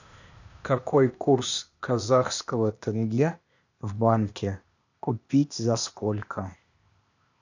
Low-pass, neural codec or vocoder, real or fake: 7.2 kHz; codec, 16 kHz, 0.8 kbps, ZipCodec; fake